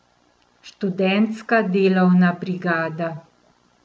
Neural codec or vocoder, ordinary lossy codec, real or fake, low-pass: none; none; real; none